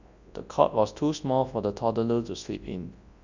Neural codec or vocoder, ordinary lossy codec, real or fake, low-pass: codec, 24 kHz, 0.9 kbps, WavTokenizer, large speech release; Opus, 64 kbps; fake; 7.2 kHz